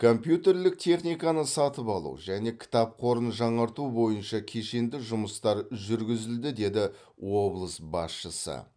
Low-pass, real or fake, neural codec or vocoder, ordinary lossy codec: 9.9 kHz; real; none; none